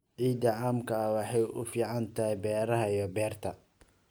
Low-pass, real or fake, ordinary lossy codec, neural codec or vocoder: none; real; none; none